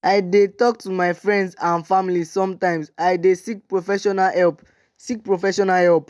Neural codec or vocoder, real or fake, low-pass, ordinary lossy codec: none; real; none; none